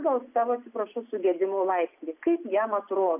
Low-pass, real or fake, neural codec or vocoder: 3.6 kHz; real; none